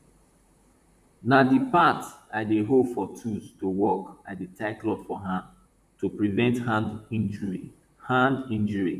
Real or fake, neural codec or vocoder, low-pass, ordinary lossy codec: fake; vocoder, 44.1 kHz, 128 mel bands, Pupu-Vocoder; 14.4 kHz; none